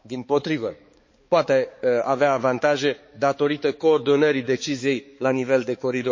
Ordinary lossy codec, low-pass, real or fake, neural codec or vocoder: MP3, 32 kbps; 7.2 kHz; fake; codec, 16 kHz, 4 kbps, X-Codec, HuBERT features, trained on balanced general audio